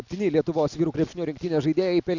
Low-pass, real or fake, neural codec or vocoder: 7.2 kHz; real; none